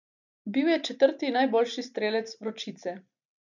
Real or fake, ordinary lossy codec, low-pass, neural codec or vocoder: real; none; 7.2 kHz; none